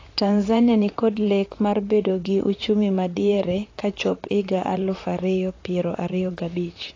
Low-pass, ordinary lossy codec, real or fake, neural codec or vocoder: 7.2 kHz; AAC, 32 kbps; fake; vocoder, 44.1 kHz, 128 mel bands, Pupu-Vocoder